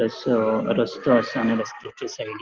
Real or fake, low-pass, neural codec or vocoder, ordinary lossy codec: real; 7.2 kHz; none; Opus, 16 kbps